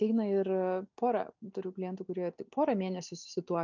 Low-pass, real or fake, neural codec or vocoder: 7.2 kHz; real; none